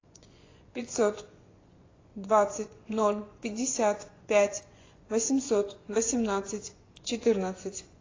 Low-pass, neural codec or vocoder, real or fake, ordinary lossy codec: 7.2 kHz; vocoder, 24 kHz, 100 mel bands, Vocos; fake; AAC, 32 kbps